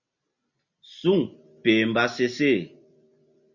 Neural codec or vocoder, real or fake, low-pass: none; real; 7.2 kHz